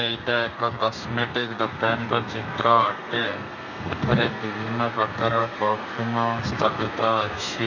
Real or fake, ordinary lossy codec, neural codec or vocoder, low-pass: fake; none; codec, 32 kHz, 1.9 kbps, SNAC; 7.2 kHz